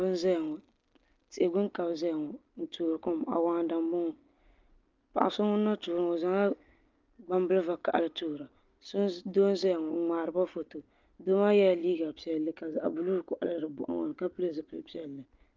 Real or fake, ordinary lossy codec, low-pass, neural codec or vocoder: real; Opus, 32 kbps; 7.2 kHz; none